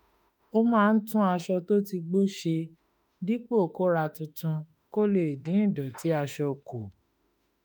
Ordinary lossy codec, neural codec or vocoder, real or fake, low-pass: none; autoencoder, 48 kHz, 32 numbers a frame, DAC-VAE, trained on Japanese speech; fake; none